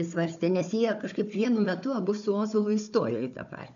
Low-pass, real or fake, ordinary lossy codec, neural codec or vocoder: 7.2 kHz; fake; MP3, 64 kbps; codec, 16 kHz, 4 kbps, FunCodec, trained on Chinese and English, 50 frames a second